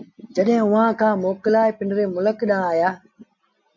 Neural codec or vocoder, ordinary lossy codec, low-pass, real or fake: none; MP3, 48 kbps; 7.2 kHz; real